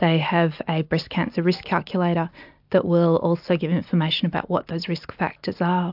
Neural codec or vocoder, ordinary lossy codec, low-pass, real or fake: none; AAC, 48 kbps; 5.4 kHz; real